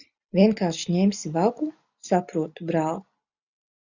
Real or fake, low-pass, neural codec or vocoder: real; 7.2 kHz; none